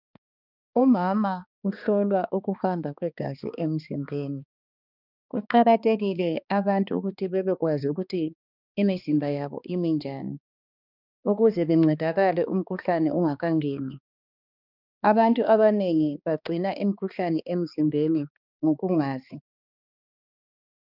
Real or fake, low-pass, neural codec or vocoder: fake; 5.4 kHz; codec, 16 kHz, 2 kbps, X-Codec, HuBERT features, trained on balanced general audio